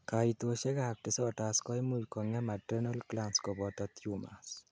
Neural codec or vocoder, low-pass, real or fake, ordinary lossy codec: none; none; real; none